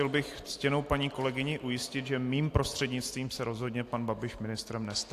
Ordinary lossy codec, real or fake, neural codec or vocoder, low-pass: AAC, 64 kbps; real; none; 14.4 kHz